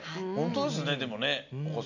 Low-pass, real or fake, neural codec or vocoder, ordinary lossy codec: 7.2 kHz; real; none; MP3, 48 kbps